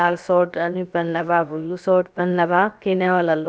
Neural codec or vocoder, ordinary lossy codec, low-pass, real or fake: codec, 16 kHz, about 1 kbps, DyCAST, with the encoder's durations; none; none; fake